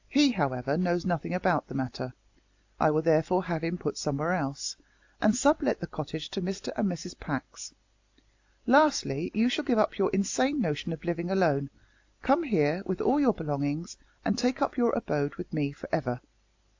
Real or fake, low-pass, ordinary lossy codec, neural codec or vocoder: real; 7.2 kHz; AAC, 48 kbps; none